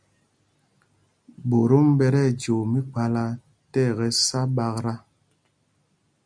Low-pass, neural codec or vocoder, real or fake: 9.9 kHz; none; real